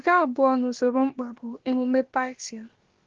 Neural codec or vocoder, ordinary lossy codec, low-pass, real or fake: codec, 16 kHz, about 1 kbps, DyCAST, with the encoder's durations; Opus, 16 kbps; 7.2 kHz; fake